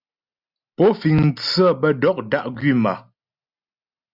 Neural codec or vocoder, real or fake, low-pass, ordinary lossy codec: none; real; 5.4 kHz; Opus, 64 kbps